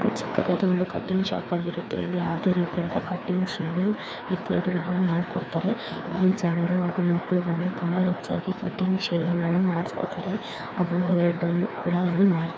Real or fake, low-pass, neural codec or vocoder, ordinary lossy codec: fake; none; codec, 16 kHz, 2 kbps, FreqCodec, larger model; none